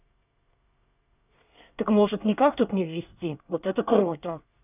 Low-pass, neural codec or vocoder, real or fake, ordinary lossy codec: 3.6 kHz; codec, 24 kHz, 1 kbps, SNAC; fake; none